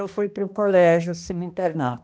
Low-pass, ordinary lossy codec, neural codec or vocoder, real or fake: none; none; codec, 16 kHz, 1 kbps, X-Codec, HuBERT features, trained on balanced general audio; fake